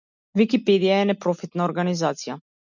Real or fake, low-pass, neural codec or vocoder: real; 7.2 kHz; none